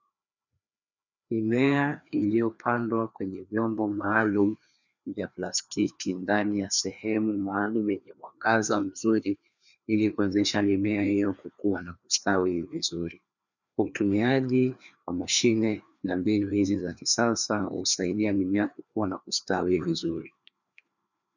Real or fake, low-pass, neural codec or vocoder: fake; 7.2 kHz; codec, 16 kHz, 2 kbps, FreqCodec, larger model